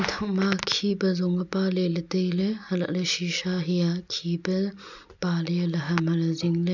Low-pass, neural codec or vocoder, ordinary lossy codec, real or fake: 7.2 kHz; none; none; real